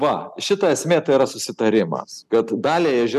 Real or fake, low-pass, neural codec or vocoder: real; 14.4 kHz; none